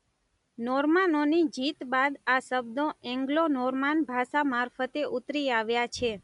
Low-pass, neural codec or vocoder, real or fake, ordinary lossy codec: 10.8 kHz; none; real; none